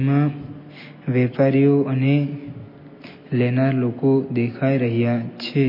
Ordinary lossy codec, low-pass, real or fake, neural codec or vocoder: MP3, 24 kbps; 5.4 kHz; real; none